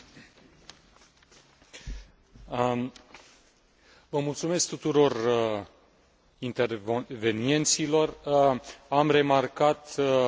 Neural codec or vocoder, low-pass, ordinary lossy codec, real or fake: none; none; none; real